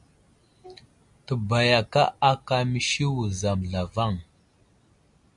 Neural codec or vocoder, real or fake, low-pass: none; real; 10.8 kHz